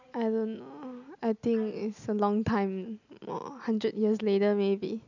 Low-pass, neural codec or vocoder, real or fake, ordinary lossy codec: 7.2 kHz; none; real; none